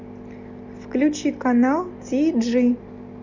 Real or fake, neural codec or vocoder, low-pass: real; none; 7.2 kHz